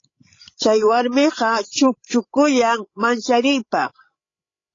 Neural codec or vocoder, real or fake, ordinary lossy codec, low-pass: codec, 16 kHz, 16 kbps, FreqCodec, larger model; fake; AAC, 32 kbps; 7.2 kHz